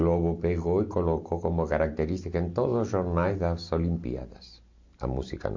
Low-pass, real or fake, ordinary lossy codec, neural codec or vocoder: 7.2 kHz; real; MP3, 48 kbps; none